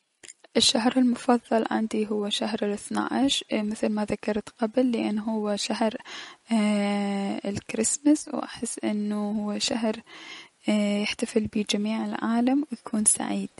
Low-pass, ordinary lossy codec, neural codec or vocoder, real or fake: 19.8 kHz; MP3, 48 kbps; none; real